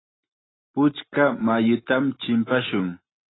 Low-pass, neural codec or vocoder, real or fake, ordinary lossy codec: 7.2 kHz; none; real; AAC, 16 kbps